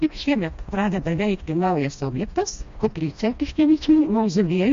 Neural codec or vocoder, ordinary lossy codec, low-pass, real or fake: codec, 16 kHz, 1 kbps, FreqCodec, smaller model; MP3, 48 kbps; 7.2 kHz; fake